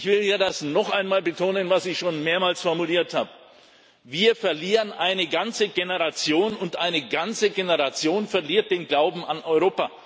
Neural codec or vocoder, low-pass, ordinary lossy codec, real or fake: none; none; none; real